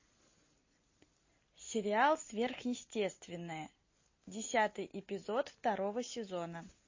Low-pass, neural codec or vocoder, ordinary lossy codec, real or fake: 7.2 kHz; none; MP3, 32 kbps; real